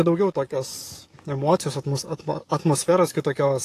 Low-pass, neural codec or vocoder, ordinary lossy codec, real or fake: 14.4 kHz; codec, 44.1 kHz, 7.8 kbps, DAC; AAC, 48 kbps; fake